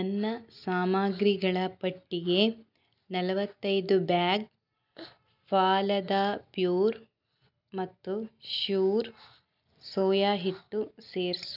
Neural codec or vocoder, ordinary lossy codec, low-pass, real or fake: none; none; 5.4 kHz; real